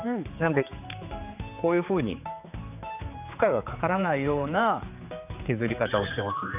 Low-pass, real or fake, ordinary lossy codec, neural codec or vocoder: 3.6 kHz; fake; none; codec, 16 kHz, 4 kbps, X-Codec, HuBERT features, trained on general audio